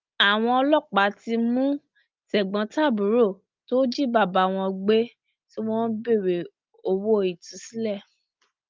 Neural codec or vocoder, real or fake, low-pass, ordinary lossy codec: none; real; 7.2 kHz; Opus, 24 kbps